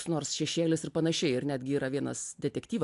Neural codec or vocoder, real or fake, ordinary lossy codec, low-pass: none; real; AAC, 64 kbps; 10.8 kHz